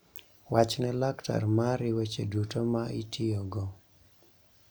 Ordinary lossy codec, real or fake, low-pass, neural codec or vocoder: none; real; none; none